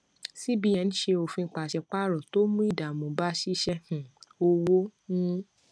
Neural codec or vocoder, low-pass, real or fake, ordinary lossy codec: none; none; real; none